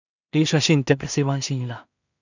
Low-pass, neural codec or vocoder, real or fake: 7.2 kHz; codec, 16 kHz in and 24 kHz out, 0.4 kbps, LongCat-Audio-Codec, two codebook decoder; fake